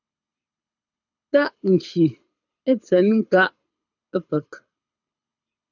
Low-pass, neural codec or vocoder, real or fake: 7.2 kHz; codec, 24 kHz, 6 kbps, HILCodec; fake